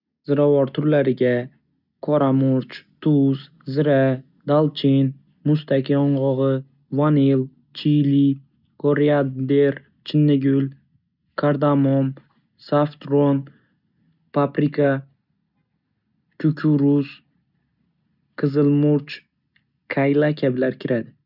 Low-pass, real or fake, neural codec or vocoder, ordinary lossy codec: 5.4 kHz; real; none; none